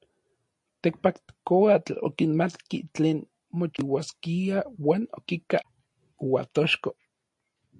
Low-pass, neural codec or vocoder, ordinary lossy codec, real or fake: 10.8 kHz; none; MP3, 48 kbps; real